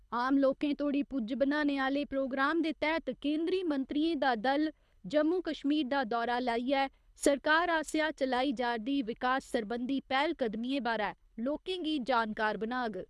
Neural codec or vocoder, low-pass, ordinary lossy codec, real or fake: codec, 24 kHz, 6 kbps, HILCodec; none; none; fake